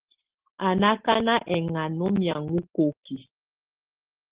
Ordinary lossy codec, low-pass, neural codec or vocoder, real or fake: Opus, 16 kbps; 3.6 kHz; none; real